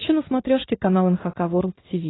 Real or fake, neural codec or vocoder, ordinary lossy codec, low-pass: real; none; AAC, 16 kbps; 7.2 kHz